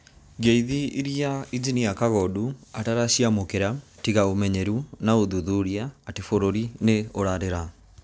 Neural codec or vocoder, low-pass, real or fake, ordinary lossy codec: none; none; real; none